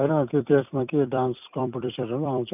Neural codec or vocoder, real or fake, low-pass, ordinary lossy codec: vocoder, 44.1 kHz, 128 mel bands every 256 samples, BigVGAN v2; fake; 3.6 kHz; none